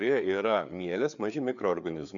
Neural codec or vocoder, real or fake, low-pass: codec, 16 kHz, 4 kbps, FreqCodec, larger model; fake; 7.2 kHz